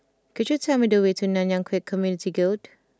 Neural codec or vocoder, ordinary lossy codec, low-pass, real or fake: none; none; none; real